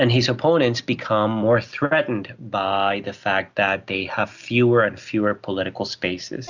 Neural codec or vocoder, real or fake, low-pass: none; real; 7.2 kHz